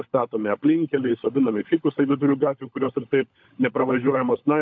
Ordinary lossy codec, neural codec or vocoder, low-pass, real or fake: AAC, 48 kbps; codec, 16 kHz, 16 kbps, FunCodec, trained on LibriTTS, 50 frames a second; 7.2 kHz; fake